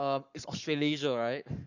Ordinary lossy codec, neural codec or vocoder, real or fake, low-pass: none; codec, 44.1 kHz, 7.8 kbps, Pupu-Codec; fake; 7.2 kHz